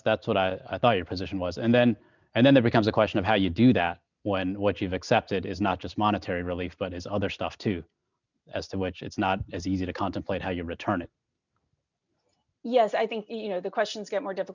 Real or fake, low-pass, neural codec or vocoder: real; 7.2 kHz; none